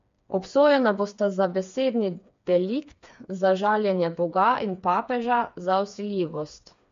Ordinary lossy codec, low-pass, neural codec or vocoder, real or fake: MP3, 48 kbps; 7.2 kHz; codec, 16 kHz, 4 kbps, FreqCodec, smaller model; fake